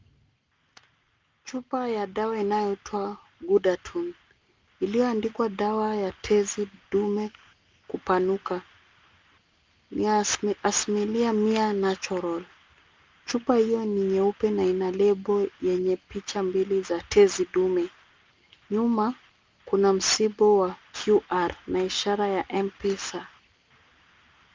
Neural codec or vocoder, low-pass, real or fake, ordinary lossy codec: none; 7.2 kHz; real; Opus, 24 kbps